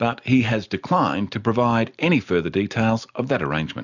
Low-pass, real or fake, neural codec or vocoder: 7.2 kHz; real; none